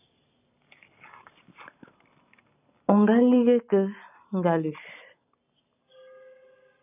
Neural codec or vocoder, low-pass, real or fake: none; 3.6 kHz; real